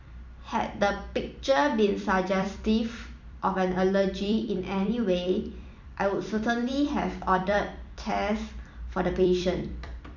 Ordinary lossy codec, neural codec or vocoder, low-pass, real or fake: none; vocoder, 44.1 kHz, 128 mel bands every 256 samples, BigVGAN v2; 7.2 kHz; fake